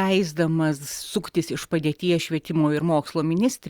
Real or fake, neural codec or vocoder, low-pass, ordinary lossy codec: real; none; 19.8 kHz; Opus, 64 kbps